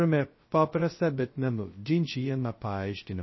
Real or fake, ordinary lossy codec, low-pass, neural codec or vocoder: fake; MP3, 24 kbps; 7.2 kHz; codec, 16 kHz, 0.2 kbps, FocalCodec